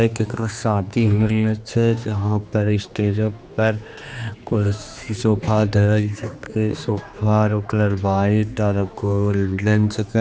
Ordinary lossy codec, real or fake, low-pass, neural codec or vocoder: none; fake; none; codec, 16 kHz, 2 kbps, X-Codec, HuBERT features, trained on general audio